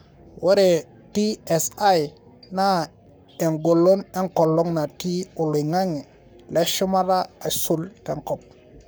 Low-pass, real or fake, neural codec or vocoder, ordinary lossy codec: none; fake; codec, 44.1 kHz, 7.8 kbps, Pupu-Codec; none